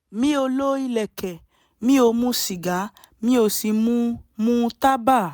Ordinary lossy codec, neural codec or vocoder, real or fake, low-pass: none; none; real; none